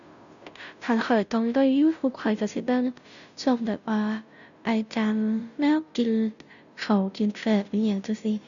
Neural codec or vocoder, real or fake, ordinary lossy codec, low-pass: codec, 16 kHz, 0.5 kbps, FunCodec, trained on Chinese and English, 25 frames a second; fake; none; 7.2 kHz